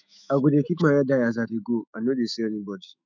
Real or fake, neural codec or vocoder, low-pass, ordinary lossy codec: fake; autoencoder, 48 kHz, 128 numbers a frame, DAC-VAE, trained on Japanese speech; 7.2 kHz; none